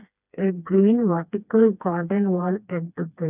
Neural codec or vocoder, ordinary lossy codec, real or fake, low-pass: codec, 16 kHz, 2 kbps, FreqCodec, smaller model; none; fake; 3.6 kHz